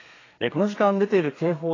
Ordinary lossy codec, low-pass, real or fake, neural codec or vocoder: AAC, 32 kbps; 7.2 kHz; fake; codec, 32 kHz, 1.9 kbps, SNAC